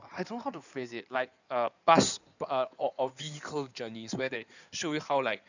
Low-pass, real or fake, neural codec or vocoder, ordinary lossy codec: 7.2 kHz; fake; codec, 16 kHz in and 24 kHz out, 2.2 kbps, FireRedTTS-2 codec; none